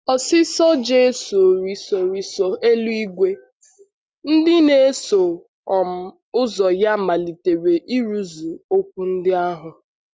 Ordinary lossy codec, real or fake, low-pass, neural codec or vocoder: none; real; none; none